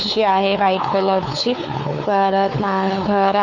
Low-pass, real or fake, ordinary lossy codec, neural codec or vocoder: 7.2 kHz; fake; none; codec, 16 kHz, 4 kbps, X-Codec, WavLM features, trained on Multilingual LibriSpeech